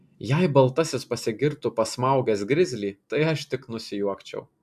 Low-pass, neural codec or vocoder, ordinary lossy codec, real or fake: 14.4 kHz; none; AAC, 96 kbps; real